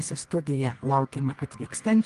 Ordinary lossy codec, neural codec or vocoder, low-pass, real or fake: Opus, 24 kbps; codec, 24 kHz, 0.9 kbps, WavTokenizer, medium music audio release; 10.8 kHz; fake